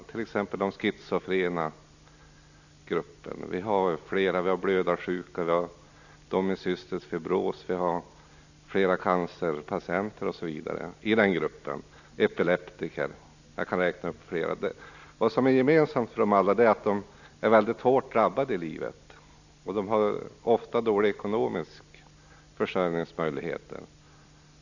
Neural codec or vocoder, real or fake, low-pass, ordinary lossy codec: none; real; 7.2 kHz; none